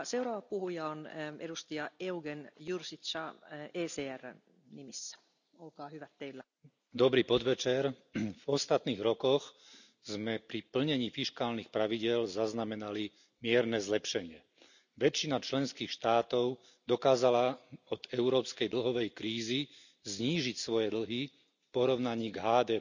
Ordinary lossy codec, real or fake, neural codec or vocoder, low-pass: none; real; none; 7.2 kHz